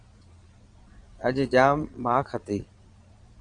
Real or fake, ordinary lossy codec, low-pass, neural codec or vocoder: fake; Opus, 64 kbps; 9.9 kHz; vocoder, 22.05 kHz, 80 mel bands, Vocos